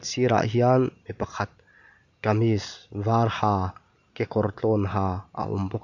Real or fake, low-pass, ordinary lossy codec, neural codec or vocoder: real; 7.2 kHz; none; none